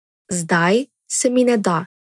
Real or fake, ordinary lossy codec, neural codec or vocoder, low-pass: real; none; none; 10.8 kHz